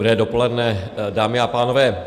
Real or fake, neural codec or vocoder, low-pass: real; none; 14.4 kHz